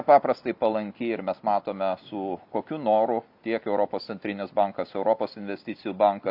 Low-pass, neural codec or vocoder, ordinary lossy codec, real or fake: 5.4 kHz; none; AAC, 48 kbps; real